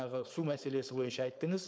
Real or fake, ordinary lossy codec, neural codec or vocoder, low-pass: fake; none; codec, 16 kHz, 4.8 kbps, FACodec; none